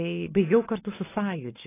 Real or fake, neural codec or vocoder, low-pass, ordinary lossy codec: real; none; 3.6 kHz; AAC, 16 kbps